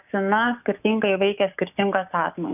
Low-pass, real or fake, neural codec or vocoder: 3.6 kHz; fake; codec, 16 kHz, 6 kbps, DAC